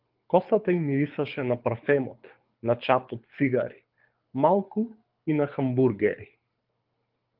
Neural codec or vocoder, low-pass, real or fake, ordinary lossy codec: codec, 24 kHz, 6 kbps, HILCodec; 5.4 kHz; fake; Opus, 32 kbps